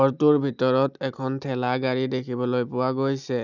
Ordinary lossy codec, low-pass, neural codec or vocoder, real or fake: none; 7.2 kHz; none; real